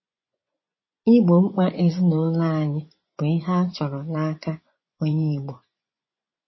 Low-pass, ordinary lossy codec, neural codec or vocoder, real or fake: 7.2 kHz; MP3, 24 kbps; vocoder, 24 kHz, 100 mel bands, Vocos; fake